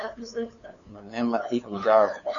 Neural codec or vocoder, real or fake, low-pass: codec, 16 kHz, 2 kbps, FunCodec, trained on LibriTTS, 25 frames a second; fake; 7.2 kHz